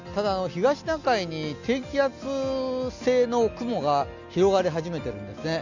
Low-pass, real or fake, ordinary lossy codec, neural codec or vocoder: 7.2 kHz; real; none; none